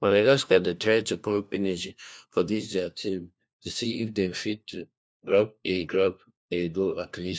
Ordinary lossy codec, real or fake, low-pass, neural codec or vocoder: none; fake; none; codec, 16 kHz, 1 kbps, FunCodec, trained on LibriTTS, 50 frames a second